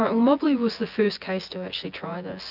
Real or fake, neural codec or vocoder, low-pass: fake; vocoder, 24 kHz, 100 mel bands, Vocos; 5.4 kHz